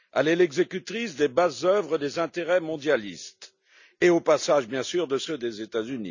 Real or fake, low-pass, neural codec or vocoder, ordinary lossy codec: real; 7.2 kHz; none; none